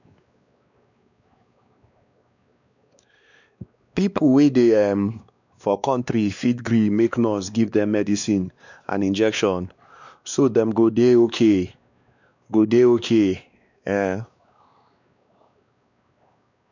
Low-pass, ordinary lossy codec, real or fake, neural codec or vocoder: 7.2 kHz; none; fake; codec, 16 kHz, 2 kbps, X-Codec, WavLM features, trained on Multilingual LibriSpeech